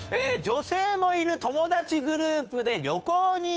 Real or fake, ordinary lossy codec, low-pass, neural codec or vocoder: fake; none; none; codec, 16 kHz, 2 kbps, FunCodec, trained on Chinese and English, 25 frames a second